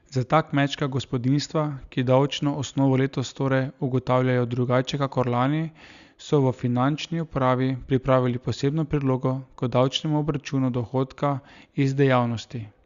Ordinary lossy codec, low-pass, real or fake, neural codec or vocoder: Opus, 64 kbps; 7.2 kHz; real; none